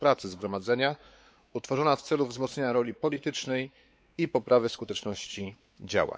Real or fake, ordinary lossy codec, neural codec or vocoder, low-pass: fake; none; codec, 16 kHz, 4 kbps, X-Codec, WavLM features, trained on Multilingual LibriSpeech; none